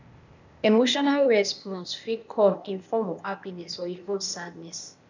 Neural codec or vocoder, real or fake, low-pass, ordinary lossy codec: codec, 16 kHz, 0.8 kbps, ZipCodec; fake; 7.2 kHz; none